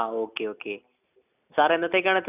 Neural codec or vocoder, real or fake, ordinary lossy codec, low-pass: none; real; none; 3.6 kHz